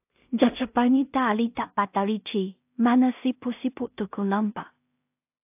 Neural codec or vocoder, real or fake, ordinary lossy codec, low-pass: codec, 16 kHz in and 24 kHz out, 0.4 kbps, LongCat-Audio-Codec, two codebook decoder; fake; AAC, 32 kbps; 3.6 kHz